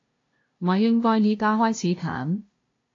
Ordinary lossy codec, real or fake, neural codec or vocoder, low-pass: AAC, 32 kbps; fake; codec, 16 kHz, 0.5 kbps, FunCodec, trained on LibriTTS, 25 frames a second; 7.2 kHz